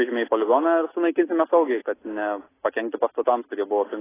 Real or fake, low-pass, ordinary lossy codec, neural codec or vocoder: real; 3.6 kHz; AAC, 16 kbps; none